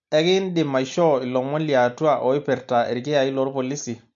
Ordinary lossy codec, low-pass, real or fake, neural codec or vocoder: MP3, 64 kbps; 7.2 kHz; real; none